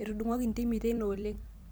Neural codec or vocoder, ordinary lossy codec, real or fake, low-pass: vocoder, 44.1 kHz, 128 mel bands every 256 samples, BigVGAN v2; none; fake; none